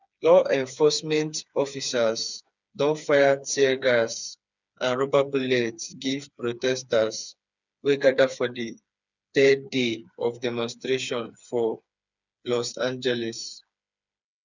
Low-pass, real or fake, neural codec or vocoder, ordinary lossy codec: 7.2 kHz; fake; codec, 16 kHz, 4 kbps, FreqCodec, smaller model; none